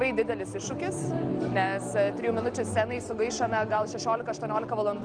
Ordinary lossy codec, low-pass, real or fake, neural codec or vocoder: Opus, 24 kbps; 9.9 kHz; real; none